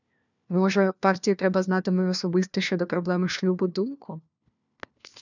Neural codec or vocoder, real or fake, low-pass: codec, 16 kHz, 1 kbps, FunCodec, trained on LibriTTS, 50 frames a second; fake; 7.2 kHz